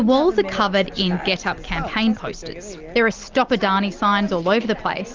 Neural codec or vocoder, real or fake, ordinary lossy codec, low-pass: none; real; Opus, 32 kbps; 7.2 kHz